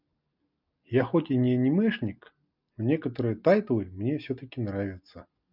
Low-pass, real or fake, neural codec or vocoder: 5.4 kHz; real; none